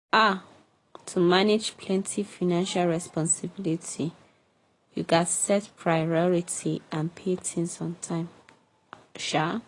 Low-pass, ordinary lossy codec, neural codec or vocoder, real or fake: 10.8 kHz; AAC, 32 kbps; none; real